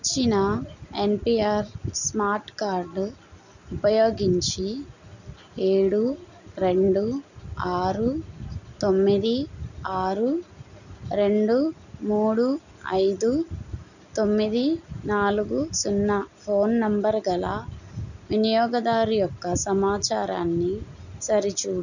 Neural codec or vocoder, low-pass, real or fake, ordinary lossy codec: none; 7.2 kHz; real; none